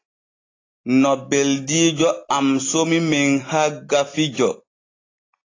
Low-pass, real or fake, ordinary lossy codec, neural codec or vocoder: 7.2 kHz; real; AAC, 32 kbps; none